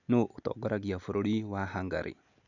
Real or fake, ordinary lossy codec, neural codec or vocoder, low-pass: real; none; none; 7.2 kHz